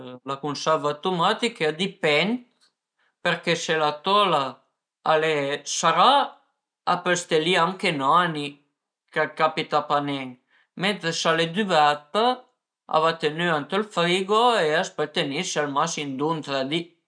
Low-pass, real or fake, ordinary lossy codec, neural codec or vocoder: 9.9 kHz; real; none; none